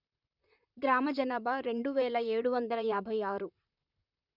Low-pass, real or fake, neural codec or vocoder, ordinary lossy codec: 5.4 kHz; fake; vocoder, 44.1 kHz, 128 mel bands, Pupu-Vocoder; none